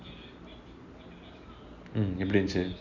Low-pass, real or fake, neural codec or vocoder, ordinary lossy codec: 7.2 kHz; real; none; none